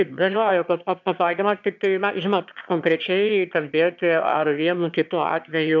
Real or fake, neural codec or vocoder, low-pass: fake; autoencoder, 22.05 kHz, a latent of 192 numbers a frame, VITS, trained on one speaker; 7.2 kHz